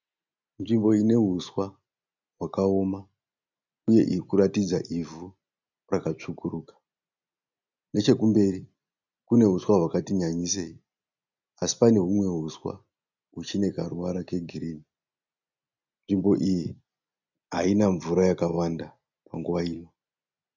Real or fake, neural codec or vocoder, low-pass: real; none; 7.2 kHz